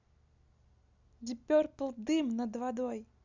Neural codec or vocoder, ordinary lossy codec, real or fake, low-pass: none; none; real; 7.2 kHz